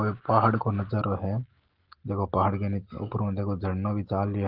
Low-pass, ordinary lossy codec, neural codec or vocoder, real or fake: 5.4 kHz; Opus, 16 kbps; none; real